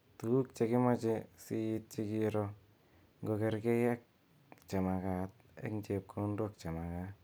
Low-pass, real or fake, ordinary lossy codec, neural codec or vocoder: none; real; none; none